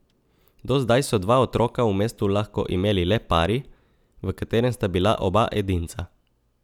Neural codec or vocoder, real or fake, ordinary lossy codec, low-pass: none; real; none; 19.8 kHz